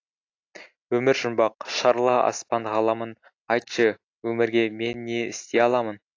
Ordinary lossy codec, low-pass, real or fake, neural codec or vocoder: AAC, 48 kbps; 7.2 kHz; real; none